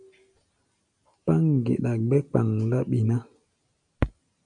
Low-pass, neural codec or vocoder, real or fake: 9.9 kHz; none; real